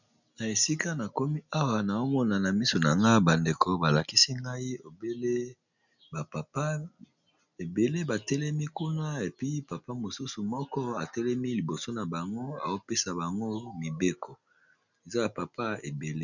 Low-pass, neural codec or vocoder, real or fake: 7.2 kHz; none; real